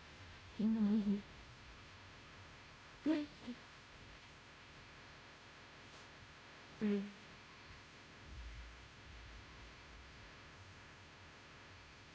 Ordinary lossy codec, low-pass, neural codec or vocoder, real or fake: none; none; codec, 16 kHz, 0.5 kbps, FunCodec, trained on Chinese and English, 25 frames a second; fake